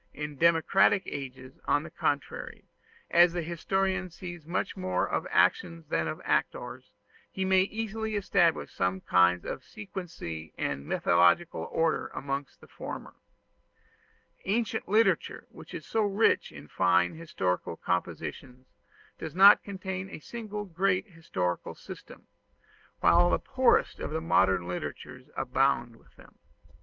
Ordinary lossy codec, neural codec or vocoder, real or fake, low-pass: Opus, 32 kbps; none; real; 7.2 kHz